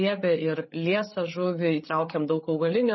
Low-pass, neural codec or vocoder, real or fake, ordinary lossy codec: 7.2 kHz; codec, 16 kHz, 8 kbps, FreqCodec, larger model; fake; MP3, 24 kbps